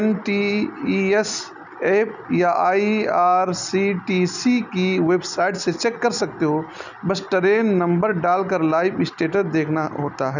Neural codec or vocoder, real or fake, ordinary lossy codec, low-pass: none; real; none; 7.2 kHz